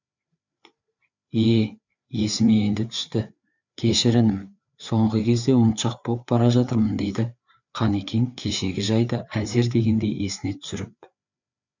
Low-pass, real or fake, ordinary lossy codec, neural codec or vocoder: 7.2 kHz; fake; none; codec, 16 kHz, 4 kbps, FreqCodec, larger model